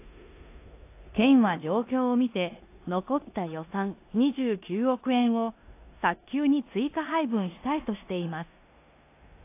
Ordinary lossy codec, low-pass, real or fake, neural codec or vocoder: AAC, 24 kbps; 3.6 kHz; fake; codec, 16 kHz in and 24 kHz out, 0.9 kbps, LongCat-Audio-Codec, four codebook decoder